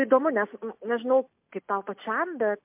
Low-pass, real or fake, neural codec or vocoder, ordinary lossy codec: 3.6 kHz; real; none; MP3, 32 kbps